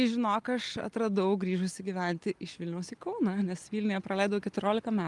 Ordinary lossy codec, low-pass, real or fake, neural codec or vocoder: Opus, 32 kbps; 10.8 kHz; real; none